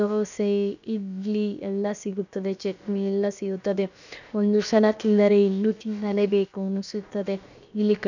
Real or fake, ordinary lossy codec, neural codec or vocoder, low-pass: fake; none; codec, 16 kHz, about 1 kbps, DyCAST, with the encoder's durations; 7.2 kHz